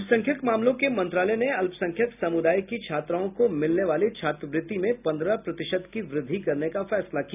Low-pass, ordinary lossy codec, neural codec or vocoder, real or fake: 3.6 kHz; none; none; real